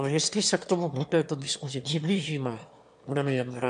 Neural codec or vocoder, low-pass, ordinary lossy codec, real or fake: autoencoder, 22.05 kHz, a latent of 192 numbers a frame, VITS, trained on one speaker; 9.9 kHz; AAC, 96 kbps; fake